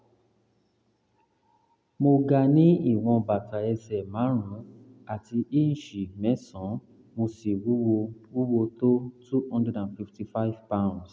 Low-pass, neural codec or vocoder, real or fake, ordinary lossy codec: none; none; real; none